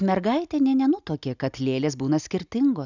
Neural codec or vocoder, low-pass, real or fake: none; 7.2 kHz; real